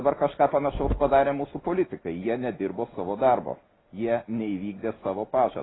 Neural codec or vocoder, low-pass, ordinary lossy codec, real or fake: none; 7.2 kHz; AAC, 16 kbps; real